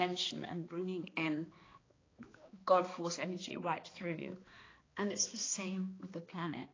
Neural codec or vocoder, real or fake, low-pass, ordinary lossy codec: codec, 16 kHz, 2 kbps, X-Codec, HuBERT features, trained on balanced general audio; fake; 7.2 kHz; AAC, 32 kbps